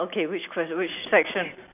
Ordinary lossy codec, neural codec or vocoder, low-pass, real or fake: none; none; 3.6 kHz; real